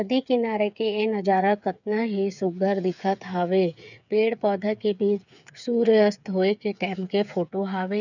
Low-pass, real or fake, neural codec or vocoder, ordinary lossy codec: 7.2 kHz; fake; codec, 16 kHz, 8 kbps, FreqCodec, smaller model; none